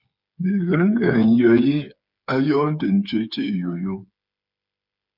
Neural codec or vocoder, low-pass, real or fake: codec, 16 kHz, 8 kbps, FreqCodec, smaller model; 5.4 kHz; fake